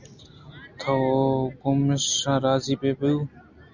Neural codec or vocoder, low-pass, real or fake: none; 7.2 kHz; real